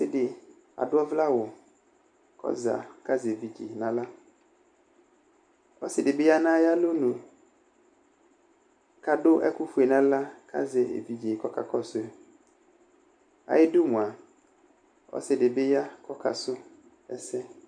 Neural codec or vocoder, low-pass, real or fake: none; 9.9 kHz; real